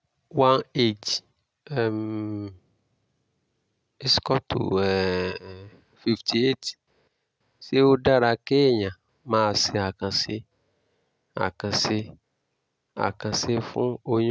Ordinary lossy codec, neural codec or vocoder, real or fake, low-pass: none; none; real; none